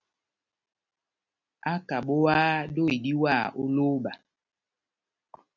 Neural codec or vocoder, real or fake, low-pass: none; real; 7.2 kHz